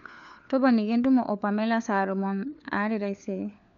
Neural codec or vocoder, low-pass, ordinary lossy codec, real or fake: codec, 16 kHz, 4 kbps, FunCodec, trained on LibriTTS, 50 frames a second; 7.2 kHz; none; fake